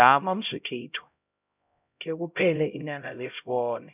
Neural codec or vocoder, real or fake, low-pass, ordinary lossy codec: codec, 16 kHz, 0.5 kbps, X-Codec, HuBERT features, trained on LibriSpeech; fake; 3.6 kHz; none